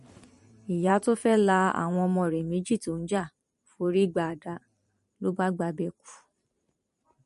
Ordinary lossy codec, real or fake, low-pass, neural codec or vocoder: MP3, 48 kbps; fake; 14.4 kHz; vocoder, 44.1 kHz, 128 mel bands every 512 samples, BigVGAN v2